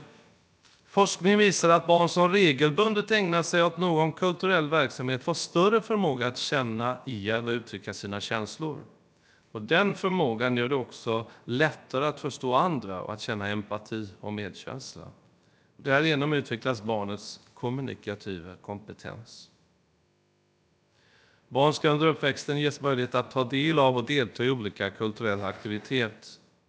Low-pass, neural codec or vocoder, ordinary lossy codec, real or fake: none; codec, 16 kHz, about 1 kbps, DyCAST, with the encoder's durations; none; fake